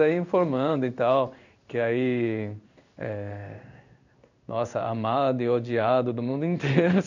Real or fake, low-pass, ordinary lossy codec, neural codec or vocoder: fake; 7.2 kHz; none; codec, 16 kHz in and 24 kHz out, 1 kbps, XY-Tokenizer